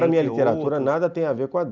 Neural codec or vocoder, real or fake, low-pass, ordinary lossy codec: none; real; 7.2 kHz; none